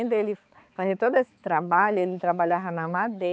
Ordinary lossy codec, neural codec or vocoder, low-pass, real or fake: none; codec, 16 kHz, 4 kbps, X-Codec, HuBERT features, trained on balanced general audio; none; fake